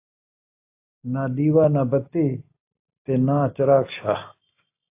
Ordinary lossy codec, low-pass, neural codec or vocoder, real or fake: AAC, 24 kbps; 3.6 kHz; none; real